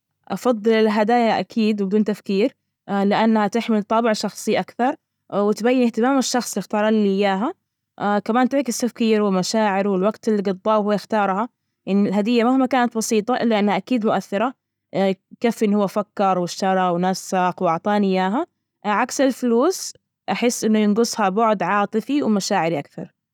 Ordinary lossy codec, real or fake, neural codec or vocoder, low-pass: none; real; none; 19.8 kHz